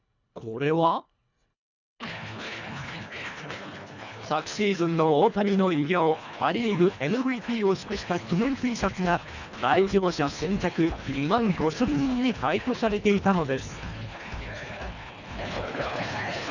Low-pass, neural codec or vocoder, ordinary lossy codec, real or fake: 7.2 kHz; codec, 24 kHz, 1.5 kbps, HILCodec; none; fake